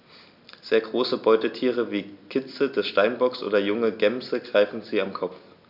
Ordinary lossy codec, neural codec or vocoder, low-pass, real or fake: none; none; 5.4 kHz; real